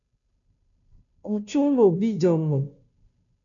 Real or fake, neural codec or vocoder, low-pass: fake; codec, 16 kHz, 0.5 kbps, FunCodec, trained on Chinese and English, 25 frames a second; 7.2 kHz